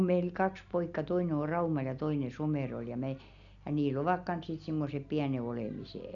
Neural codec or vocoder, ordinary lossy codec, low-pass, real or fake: none; none; 7.2 kHz; real